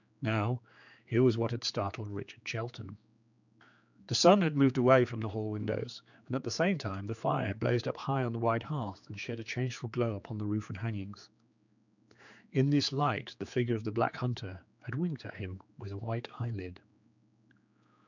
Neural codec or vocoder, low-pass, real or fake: codec, 16 kHz, 4 kbps, X-Codec, HuBERT features, trained on general audio; 7.2 kHz; fake